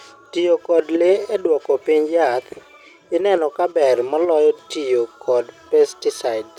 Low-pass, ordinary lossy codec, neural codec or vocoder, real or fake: 19.8 kHz; none; none; real